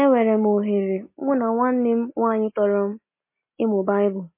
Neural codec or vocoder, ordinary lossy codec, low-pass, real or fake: none; MP3, 24 kbps; 3.6 kHz; real